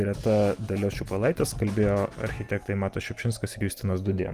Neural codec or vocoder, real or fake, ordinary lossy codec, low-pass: none; real; Opus, 32 kbps; 14.4 kHz